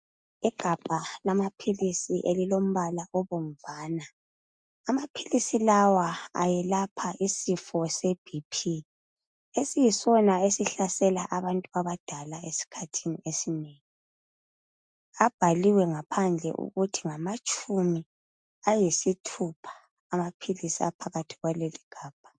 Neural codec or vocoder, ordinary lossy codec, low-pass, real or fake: none; MP3, 64 kbps; 9.9 kHz; real